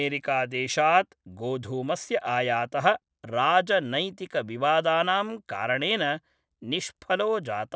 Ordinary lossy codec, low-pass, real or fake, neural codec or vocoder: none; none; real; none